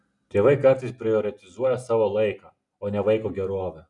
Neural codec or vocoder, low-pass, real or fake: none; 10.8 kHz; real